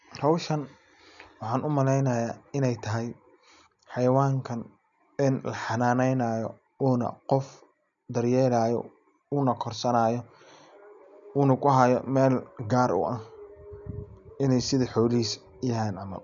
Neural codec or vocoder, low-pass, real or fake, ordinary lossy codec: none; 7.2 kHz; real; none